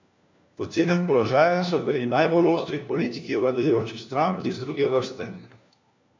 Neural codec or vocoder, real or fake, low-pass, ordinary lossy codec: codec, 16 kHz, 1 kbps, FunCodec, trained on LibriTTS, 50 frames a second; fake; 7.2 kHz; MP3, 64 kbps